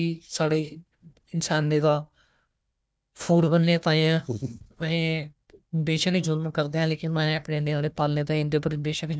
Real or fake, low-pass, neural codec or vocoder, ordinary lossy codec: fake; none; codec, 16 kHz, 1 kbps, FunCodec, trained on Chinese and English, 50 frames a second; none